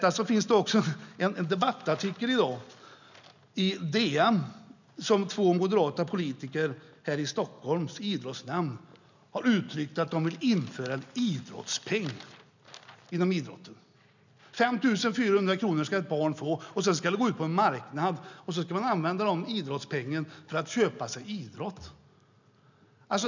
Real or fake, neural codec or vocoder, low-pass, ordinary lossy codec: real; none; 7.2 kHz; none